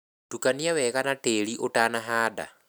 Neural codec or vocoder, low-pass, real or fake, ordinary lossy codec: none; none; real; none